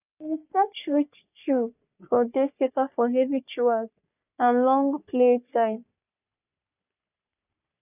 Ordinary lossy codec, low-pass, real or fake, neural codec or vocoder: none; 3.6 kHz; fake; codec, 44.1 kHz, 3.4 kbps, Pupu-Codec